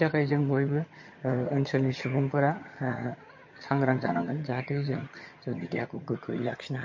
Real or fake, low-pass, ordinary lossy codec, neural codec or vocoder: fake; 7.2 kHz; MP3, 32 kbps; vocoder, 22.05 kHz, 80 mel bands, HiFi-GAN